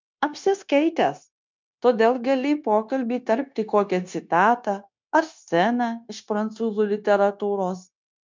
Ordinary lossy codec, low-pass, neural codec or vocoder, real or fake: MP3, 64 kbps; 7.2 kHz; codec, 16 kHz, 0.9 kbps, LongCat-Audio-Codec; fake